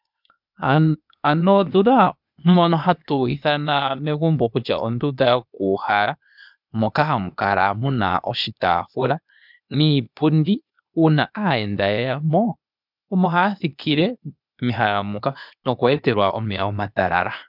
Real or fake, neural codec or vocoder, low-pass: fake; codec, 16 kHz, 0.8 kbps, ZipCodec; 5.4 kHz